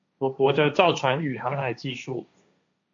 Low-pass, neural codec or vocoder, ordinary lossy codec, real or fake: 7.2 kHz; codec, 16 kHz, 1.1 kbps, Voila-Tokenizer; AAC, 64 kbps; fake